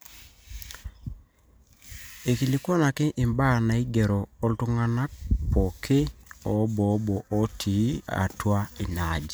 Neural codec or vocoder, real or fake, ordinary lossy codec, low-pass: none; real; none; none